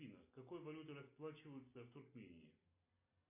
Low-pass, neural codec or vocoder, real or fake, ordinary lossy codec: 3.6 kHz; none; real; MP3, 24 kbps